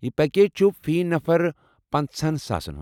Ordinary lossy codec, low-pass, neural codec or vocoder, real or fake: none; 19.8 kHz; none; real